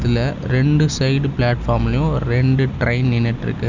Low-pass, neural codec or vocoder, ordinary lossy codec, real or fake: 7.2 kHz; none; none; real